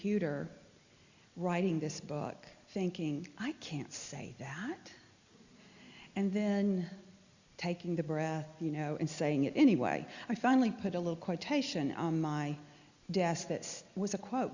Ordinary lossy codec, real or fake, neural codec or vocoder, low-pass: Opus, 64 kbps; real; none; 7.2 kHz